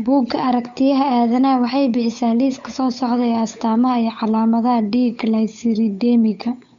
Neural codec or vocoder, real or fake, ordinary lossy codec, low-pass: codec, 16 kHz, 8 kbps, FunCodec, trained on Chinese and English, 25 frames a second; fake; MP3, 64 kbps; 7.2 kHz